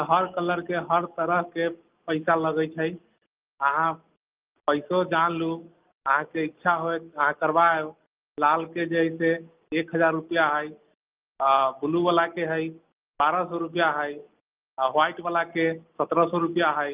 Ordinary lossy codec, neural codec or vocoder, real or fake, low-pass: Opus, 24 kbps; none; real; 3.6 kHz